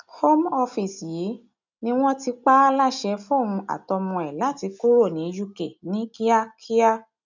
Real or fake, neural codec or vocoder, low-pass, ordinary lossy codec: real; none; 7.2 kHz; none